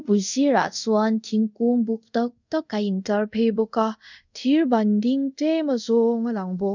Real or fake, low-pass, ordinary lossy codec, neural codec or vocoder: fake; 7.2 kHz; none; codec, 24 kHz, 0.5 kbps, DualCodec